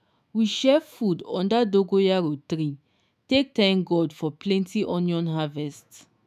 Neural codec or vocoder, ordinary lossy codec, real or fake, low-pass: autoencoder, 48 kHz, 128 numbers a frame, DAC-VAE, trained on Japanese speech; none; fake; 14.4 kHz